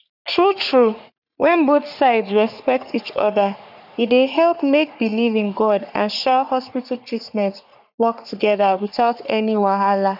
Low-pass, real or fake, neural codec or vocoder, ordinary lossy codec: 5.4 kHz; fake; codec, 44.1 kHz, 3.4 kbps, Pupu-Codec; none